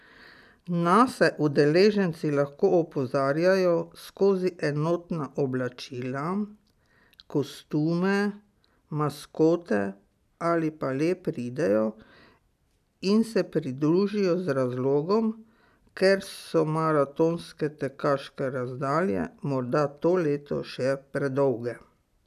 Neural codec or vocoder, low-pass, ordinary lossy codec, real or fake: none; 14.4 kHz; none; real